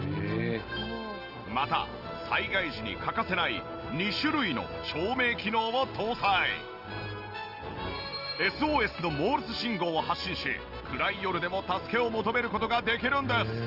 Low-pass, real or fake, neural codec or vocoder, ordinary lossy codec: 5.4 kHz; real; none; Opus, 32 kbps